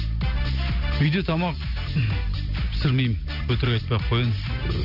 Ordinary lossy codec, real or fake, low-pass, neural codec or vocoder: none; real; 5.4 kHz; none